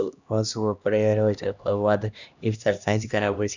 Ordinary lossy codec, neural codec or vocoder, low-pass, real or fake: none; codec, 16 kHz, 1 kbps, X-Codec, HuBERT features, trained on LibriSpeech; 7.2 kHz; fake